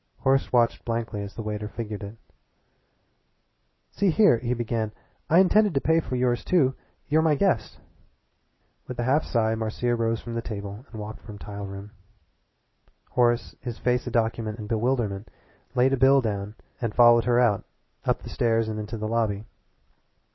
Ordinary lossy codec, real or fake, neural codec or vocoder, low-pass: MP3, 24 kbps; real; none; 7.2 kHz